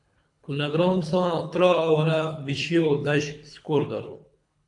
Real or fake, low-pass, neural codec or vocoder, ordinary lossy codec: fake; 10.8 kHz; codec, 24 kHz, 3 kbps, HILCodec; AAC, 64 kbps